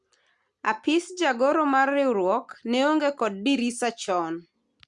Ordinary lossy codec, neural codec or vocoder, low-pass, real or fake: Opus, 64 kbps; none; 10.8 kHz; real